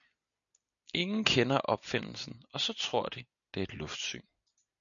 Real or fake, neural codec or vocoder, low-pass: real; none; 7.2 kHz